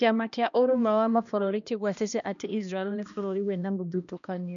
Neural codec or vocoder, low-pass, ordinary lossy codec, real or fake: codec, 16 kHz, 1 kbps, X-Codec, HuBERT features, trained on balanced general audio; 7.2 kHz; MP3, 96 kbps; fake